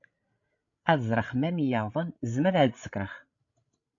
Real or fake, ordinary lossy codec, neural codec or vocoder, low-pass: fake; AAC, 48 kbps; codec, 16 kHz, 16 kbps, FreqCodec, larger model; 7.2 kHz